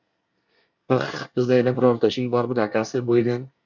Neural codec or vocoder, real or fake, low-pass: codec, 24 kHz, 1 kbps, SNAC; fake; 7.2 kHz